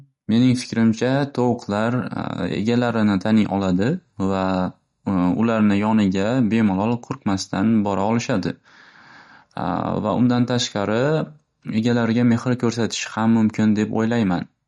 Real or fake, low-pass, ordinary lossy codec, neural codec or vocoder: real; 19.8 kHz; MP3, 48 kbps; none